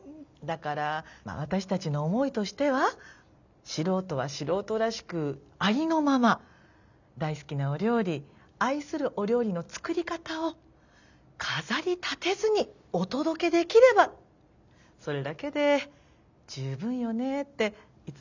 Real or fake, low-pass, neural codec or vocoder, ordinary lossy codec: real; 7.2 kHz; none; none